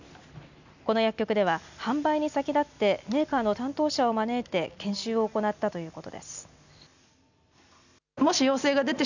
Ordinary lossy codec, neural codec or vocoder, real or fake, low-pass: none; none; real; 7.2 kHz